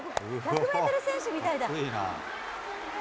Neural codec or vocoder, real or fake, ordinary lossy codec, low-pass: none; real; none; none